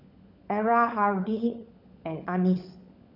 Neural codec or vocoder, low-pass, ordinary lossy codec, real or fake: codec, 16 kHz, 16 kbps, FunCodec, trained on LibriTTS, 50 frames a second; 5.4 kHz; none; fake